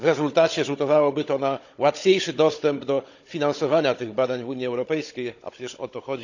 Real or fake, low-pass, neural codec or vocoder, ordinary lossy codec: fake; 7.2 kHz; codec, 16 kHz, 16 kbps, FunCodec, trained on Chinese and English, 50 frames a second; none